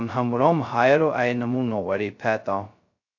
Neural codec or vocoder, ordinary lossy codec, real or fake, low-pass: codec, 16 kHz, 0.2 kbps, FocalCodec; AAC, 48 kbps; fake; 7.2 kHz